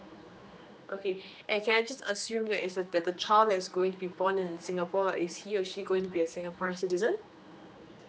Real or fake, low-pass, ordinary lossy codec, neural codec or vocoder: fake; none; none; codec, 16 kHz, 2 kbps, X-Codec, HuBERT features, trained on general audio